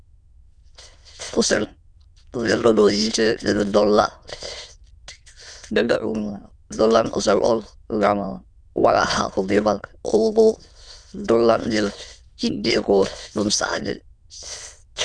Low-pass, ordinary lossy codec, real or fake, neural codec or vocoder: 9.9 kHz; Opus, 64 kbps; fake; autoencoder, 22.05 kHz, a latent of 192 numbers a frame, VITS, trained on many speakers